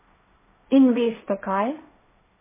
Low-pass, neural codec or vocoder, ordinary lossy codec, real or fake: 3.6 kHz; codec, 16 kHz, 1.1 kbps, Voila-Tokenizer; MP3, 16 kbps; fake